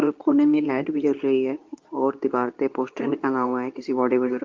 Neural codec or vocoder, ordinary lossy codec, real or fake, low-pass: codec, 24 kHz, 0.9 kbps, WavTokenizer, medium speech release version 2; Opus, 24 kbps; fake; 7.2 kHz